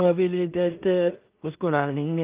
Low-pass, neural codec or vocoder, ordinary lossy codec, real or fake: 3.6 kHz; codec, 16 kHz in and 24 kHz out, 0.4 kbps, LongCat-Audio-Codec, two codebook decoder; Opus, 32 kbps; fake